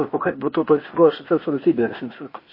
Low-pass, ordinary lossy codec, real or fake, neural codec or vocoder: 5.4 kHz; MP3, 24 kbps; fake; codec, 16 kHz, 0.8 kbps, ZipCodec